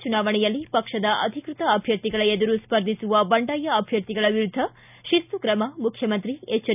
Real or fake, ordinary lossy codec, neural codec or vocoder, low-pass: real; none; none; 3.6 kHz